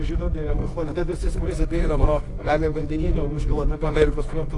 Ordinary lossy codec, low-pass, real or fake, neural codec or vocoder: AAC, 48 kbps; 10.8 kHz; fake; codec, 24 kHz, 0.9 kbps, WavTokenizer, medium music audio release